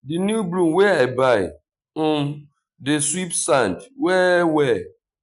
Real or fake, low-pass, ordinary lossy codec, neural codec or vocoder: real; 10.8 kHz; none; none